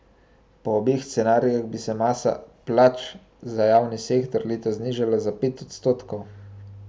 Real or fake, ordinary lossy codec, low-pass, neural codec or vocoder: real; none; none; none